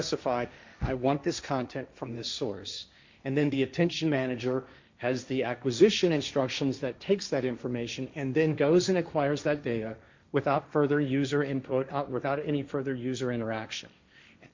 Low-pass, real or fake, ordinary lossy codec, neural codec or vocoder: 7.2 kHz; fake; MP3, 64 kbps; codec, 16 kHz, 1.1 kbps, Voila-Tokenizer